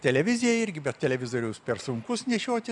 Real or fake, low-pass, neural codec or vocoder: real; 10.8 kHz; none